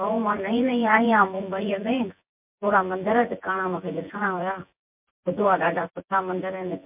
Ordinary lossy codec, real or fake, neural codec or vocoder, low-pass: MP3, 32 kbps; fake; vocoder, 24 kHz, 100 mel bands, Vocos; 3.6 kHz